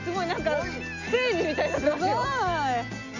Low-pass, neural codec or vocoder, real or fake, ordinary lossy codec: 7.2 kHz; none; real; none